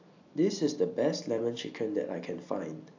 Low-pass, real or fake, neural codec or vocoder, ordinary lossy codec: 7.2 kHz; real; none; AAC, 48 kbps